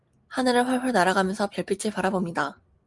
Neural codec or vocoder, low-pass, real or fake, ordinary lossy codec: none; 10.8 kHz; real; Opus, 32 kbps